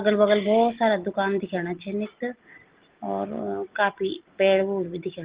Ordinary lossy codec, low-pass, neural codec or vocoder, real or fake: Opus, 16 kbps; 3.6 kHz; none; real